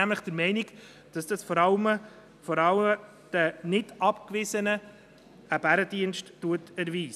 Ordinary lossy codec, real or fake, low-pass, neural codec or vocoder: none; real; 14.4 kHz; none